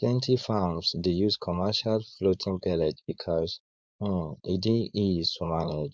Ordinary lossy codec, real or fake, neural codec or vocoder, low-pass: none; fake; codec, 16 kHz, 4.8 kbps, FACodec; none